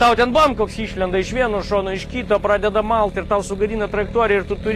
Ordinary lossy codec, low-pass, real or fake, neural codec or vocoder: AAC, 48 kbps; 14.4 kHz; real; none